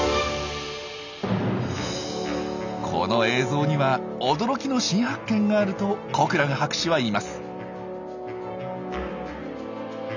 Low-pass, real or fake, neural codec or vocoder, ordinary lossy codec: 7.2 kHz; real; none; none